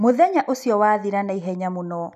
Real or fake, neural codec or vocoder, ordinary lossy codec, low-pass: real; none; none; 14.4 kHz